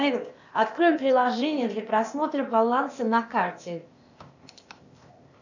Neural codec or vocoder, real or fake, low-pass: codec, 16 kHz, 0.8 kbps, ZipCodec; fake; 7.2 kHz